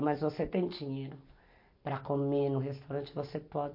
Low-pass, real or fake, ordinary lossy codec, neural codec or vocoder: 5.4 kHz; real; MP3, 32 kbps; none